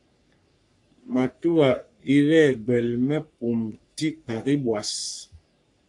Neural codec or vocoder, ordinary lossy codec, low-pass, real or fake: codec, 44.1 kHz, 3.4 kbps, Pupu-Codec; AAC, 64 kbps; 10.8 kHz; fake